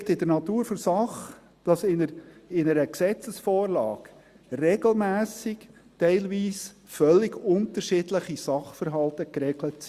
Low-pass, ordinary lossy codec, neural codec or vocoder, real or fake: 14.4 kHz; Opus, 64 kbps; none; real